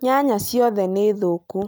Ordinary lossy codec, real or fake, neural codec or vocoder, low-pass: none; real; none; none